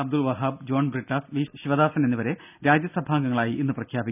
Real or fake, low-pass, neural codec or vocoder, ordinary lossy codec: real; 3.6 kHz; none; none